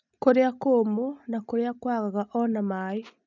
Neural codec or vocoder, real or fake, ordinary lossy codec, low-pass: none; real; none; 7.2 kHz